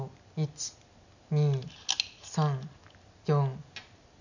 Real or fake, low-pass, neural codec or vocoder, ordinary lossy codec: real; 7.2 kHz; none; none